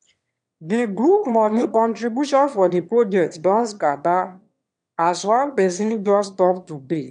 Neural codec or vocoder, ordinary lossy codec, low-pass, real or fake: autoencoder, 22.05 kHz, a latent of 192 numbers a frame, VITS, trained on one speaker; none; 9.9 kHz; fake